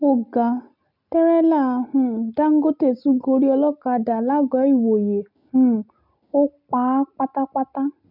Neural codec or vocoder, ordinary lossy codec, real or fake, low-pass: none; none; real; 5.4 kHz